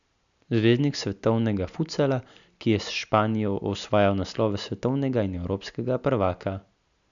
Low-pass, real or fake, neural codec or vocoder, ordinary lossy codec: 7.2 kHz; real; none; none